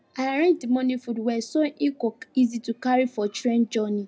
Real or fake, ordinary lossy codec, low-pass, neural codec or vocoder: real; none; none; none